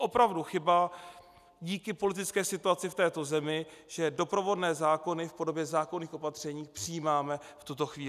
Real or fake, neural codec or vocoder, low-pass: real; none; 14.4 kHz